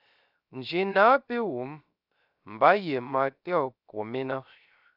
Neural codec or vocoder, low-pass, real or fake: codec, 16 kHz, 0.3 kbps, FocalCodec; 5.4 kHz; fake